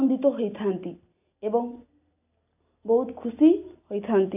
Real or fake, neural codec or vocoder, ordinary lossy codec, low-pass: real; none; AAC, 32 kbps; 3.6 kHz